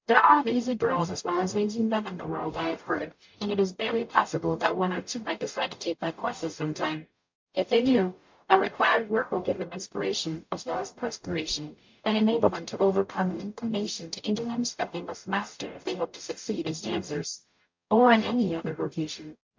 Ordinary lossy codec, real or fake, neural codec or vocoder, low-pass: MP3, 48 kbps; fake; codec, 44.1 kHz, 0.9 kbps, DAC; 7.2 kHz